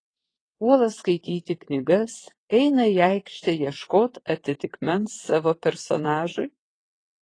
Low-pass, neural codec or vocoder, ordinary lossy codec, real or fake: 9.9 kHz; vocoder, 22.05 kHz, 80 mel bands, WaveNeXt; AAC, 32 kbps; fake